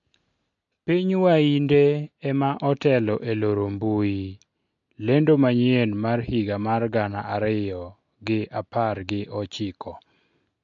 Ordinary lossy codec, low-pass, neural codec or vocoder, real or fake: MP3, 48 kbps; 7.2 kHz; none; real